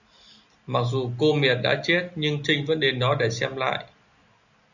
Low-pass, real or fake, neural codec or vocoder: 7.2 kHz; real; none